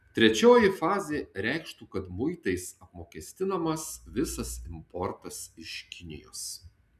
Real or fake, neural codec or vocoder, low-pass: real; none; 14.4 kHz